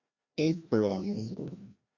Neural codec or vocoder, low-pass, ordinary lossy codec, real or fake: codec, 16 kHz, 1 kbps, FreqCodec, larger model; 7.2 kHz; Opus, 64 kbps; fake